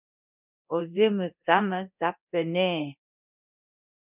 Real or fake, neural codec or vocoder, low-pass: fake; codec, 24 kHz, 0.9 kbps, DualCodec; 3.6 kHz